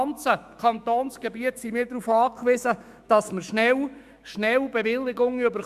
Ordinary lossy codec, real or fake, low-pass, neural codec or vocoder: Opus, 64 kbps; fake; 14.4 kHz; autoencoder, 48 kHz, 128 numbers a frame, DAC-VAE, trained on Japanese speech